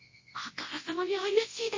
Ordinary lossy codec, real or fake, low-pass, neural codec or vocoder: MP3, 32 kbps; fake; 7.2 kHz; codec, 24 kHz, 0.9 kbps, WavTokenizer, large speech release